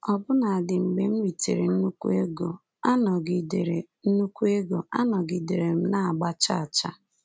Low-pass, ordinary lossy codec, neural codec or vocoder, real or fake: none; none; none; real